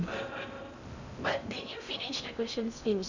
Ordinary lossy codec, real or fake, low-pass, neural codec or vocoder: none; fake; 7.2 kHz; codec, 16 kHz in and 24 kHz out, 0.6 kbps, FocalCodec, streaming, 4096 codes